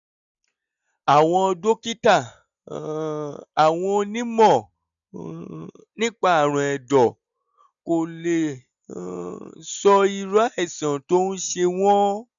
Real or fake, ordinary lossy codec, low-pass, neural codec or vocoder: real; none; 7.2 kHz; none